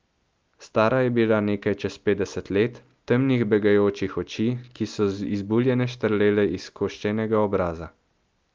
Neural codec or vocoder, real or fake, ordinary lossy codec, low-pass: none; real; Opus, 24 kbps; 7.2 kHz